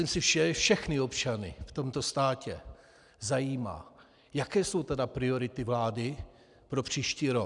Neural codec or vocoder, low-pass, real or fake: none; 10.8 kHz; real